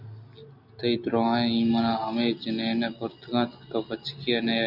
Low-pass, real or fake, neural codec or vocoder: 5.4 kHz; real; none